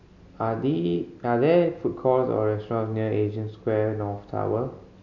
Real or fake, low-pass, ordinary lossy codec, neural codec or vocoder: real; 7.2 kHz; none; none